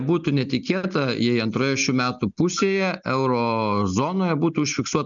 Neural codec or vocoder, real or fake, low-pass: none; real; 7.2 kHz